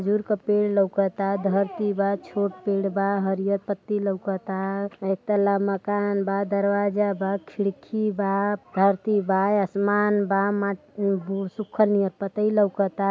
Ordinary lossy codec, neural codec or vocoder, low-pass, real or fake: none; none; none; real